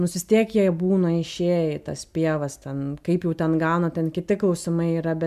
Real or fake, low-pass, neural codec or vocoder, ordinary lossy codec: real; 14.4 kHz; none; MP3, 96 kbps